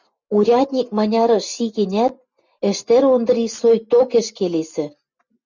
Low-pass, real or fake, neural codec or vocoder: 7.2 kHz; real; none